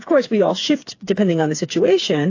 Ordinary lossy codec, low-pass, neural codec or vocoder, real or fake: AAC, 48 kbps; 7.2 kHz; codec, 16 kHz, 4 kbps, FreqCodec, smaller model; fake